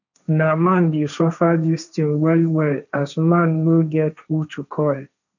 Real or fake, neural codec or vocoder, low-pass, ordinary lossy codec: fake; codec, 16 kHz, 1.1 kbps, Voila-Tokenizer; 7.2 kHz; none